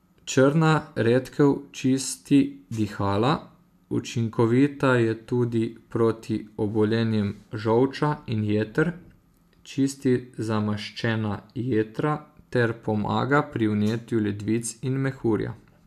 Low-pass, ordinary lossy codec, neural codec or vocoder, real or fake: 14.4 kHz; AAC, 96 kbps; none; real